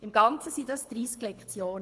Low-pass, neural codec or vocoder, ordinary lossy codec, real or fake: none; codec, 24 kHz, 6 kbps, HILCodec; none; fake